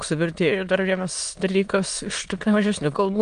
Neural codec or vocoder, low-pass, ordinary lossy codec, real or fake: autoencoder, 22.05 kHz, a latent of 192 numbers a frame, VITS, trained on many speakers; 9.9 kHz; Opus, 32 kbps; fake